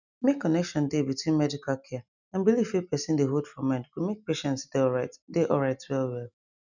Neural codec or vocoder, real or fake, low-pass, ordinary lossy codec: none; real; 7.2 kHz; none